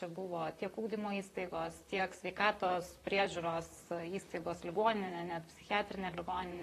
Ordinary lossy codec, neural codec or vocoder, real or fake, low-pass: AAC, 48 kbps; vocoder, 44.1 kHz, 128 mel bands, Pupu-Vocoder; fake; 14.4 kHz